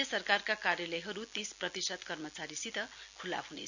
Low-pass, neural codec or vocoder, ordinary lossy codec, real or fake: 7.2 kHz; none; none; real